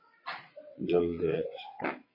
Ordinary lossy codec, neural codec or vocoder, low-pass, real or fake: MP3, 24 kbps; vocoder, 22.05 kHz, 80 mel bands, WaveNeXt; 5.4 kHz; fake